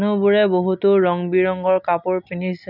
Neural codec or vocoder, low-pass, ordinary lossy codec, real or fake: none; 5.4 kHz; none; real